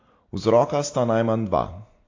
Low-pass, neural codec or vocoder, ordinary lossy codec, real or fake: 7.2 kHz; none; AAC, 32 kbps; real